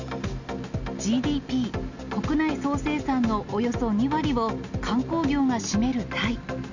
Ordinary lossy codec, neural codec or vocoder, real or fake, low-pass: none; none; real; 7.2 kHz